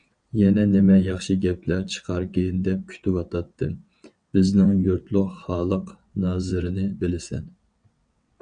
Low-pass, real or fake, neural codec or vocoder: 9.9 kHz; fake; vocoder, 22.05 kHz, 80 mel bands, WaveNeXt